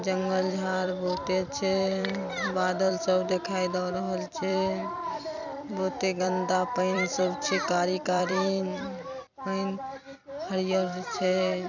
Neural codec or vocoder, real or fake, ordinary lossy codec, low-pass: vocoder, 44.1 kHz, 128 mel bands every 256 samples, BigVGAN v2; fake; none; 7.2 kHz